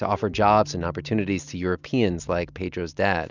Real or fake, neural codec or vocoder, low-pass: real; none; 7.2 kHz